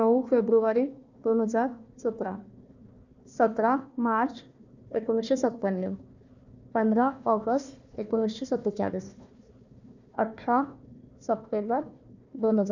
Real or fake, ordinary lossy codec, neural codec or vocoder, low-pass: fake; none; codec, 16 kHz, 1 kbps, FunCodec, trained on Chinese and English, 50 frames a second; 7.2 kHz